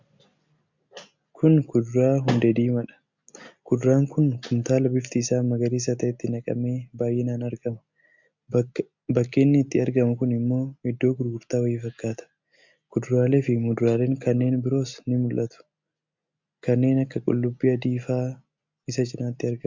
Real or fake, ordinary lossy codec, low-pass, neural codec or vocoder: real; MP3, 64 kbps; 7.2 kHz; none